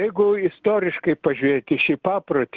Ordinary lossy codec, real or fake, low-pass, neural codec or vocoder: Opus, 16 kbps; real; 7.2 kHz; none